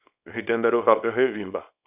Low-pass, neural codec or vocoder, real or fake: 3.6 kHz; codec, 24 kHz, 0.9 kbps, WavTokenizer, small release; fake